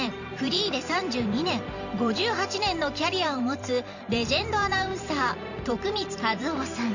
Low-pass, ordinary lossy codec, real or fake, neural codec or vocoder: 7.2 kHz; none; fake; vocoder, 44.1 kHz, 128 mel bands every 512 samples, BigVGAN v2